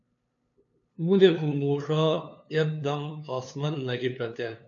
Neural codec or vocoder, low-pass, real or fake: codec, 16 kHz, 2 kbps, FunCodec, trained on LibriTTS, 25 frames a second; 7.2 kHz; fake